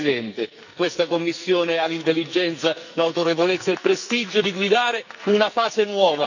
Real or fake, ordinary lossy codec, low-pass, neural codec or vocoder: fake; none; 7.2 kHz; codec, 44.1 kHz, 2.6 kbps, SNAC